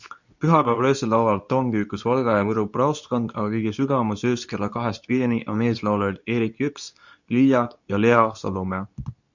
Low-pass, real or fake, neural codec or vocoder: 7.2 kHz; fake; codec, 24 kHz, 0.9 kbps, WavTokenizer, medium speech release version 2